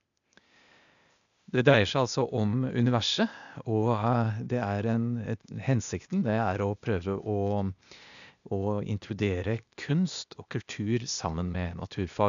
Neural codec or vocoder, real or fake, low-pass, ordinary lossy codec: codec, 16 kHz, 0.8 kbps, ZipCodec; fake; 7.2 kHz; MP3, 96 kbps